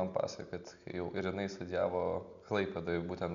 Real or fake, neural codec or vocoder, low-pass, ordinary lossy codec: real; none; 7.2 kHz; MP3, 96 kbps